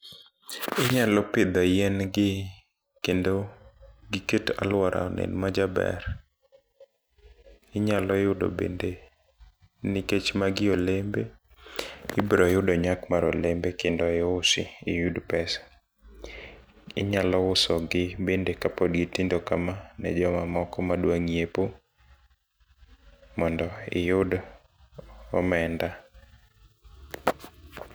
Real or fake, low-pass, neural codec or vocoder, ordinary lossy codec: real; none; none; none